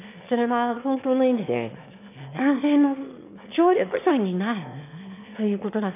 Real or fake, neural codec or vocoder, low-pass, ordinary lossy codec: fake; autoencoder, 22.05 kHz, a latent of 192 numbers a frame, VITS, trained on one speaker; 3.6 kHz; none